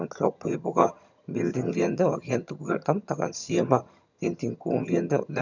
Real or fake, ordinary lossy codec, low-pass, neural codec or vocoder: fake; none; 7.2 kHz; vocoder, 22.05 kHz, 80 mel bands, HiFi-GAN